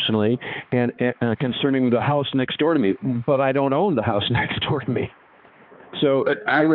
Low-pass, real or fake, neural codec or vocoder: 5.4 kHz; fake; codec, 16 kHz, 2 kbps, X-Codec, HuBERT features, trained on balanced general audio